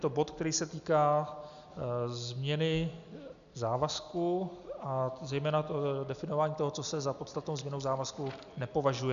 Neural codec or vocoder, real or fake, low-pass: none; real; 7.2 kHz